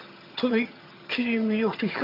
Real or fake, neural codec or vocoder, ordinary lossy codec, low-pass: fake; vocoder, 22.05 kHz, 80 mel bands, HiFi-GAN; MP3, 48 kbps; 5.4 kHz